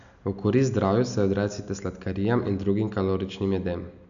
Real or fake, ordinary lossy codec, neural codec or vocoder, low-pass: real; none; none; 7.2 kHz